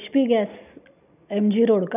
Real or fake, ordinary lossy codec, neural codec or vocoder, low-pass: real; none; none; 3.6 kHz